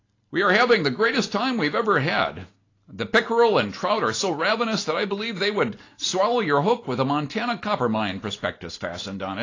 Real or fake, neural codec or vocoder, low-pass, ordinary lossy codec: real; none; 7.2 kHz; AAC, 32 kbps